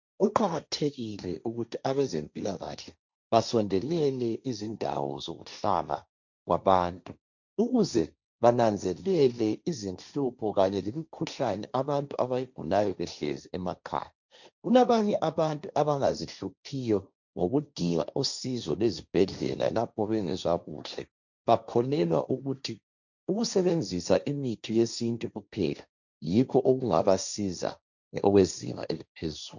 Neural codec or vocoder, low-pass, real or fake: codec, 16 kHz, 1.1 kbps, Voila-Tokenizer; 7.2 kHz; fake